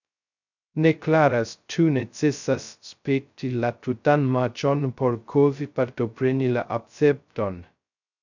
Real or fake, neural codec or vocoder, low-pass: fake; codec, 16 kHz, 0.2 kbps, FocalCodec; 7.2 kHz